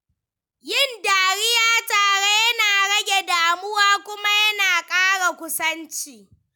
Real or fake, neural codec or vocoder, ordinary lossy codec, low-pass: real; none; none; none